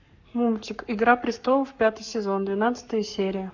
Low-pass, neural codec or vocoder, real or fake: 7.2 kHz; codec, 44.1 kHz, 7.8 kbps, Pupu-Codec; fake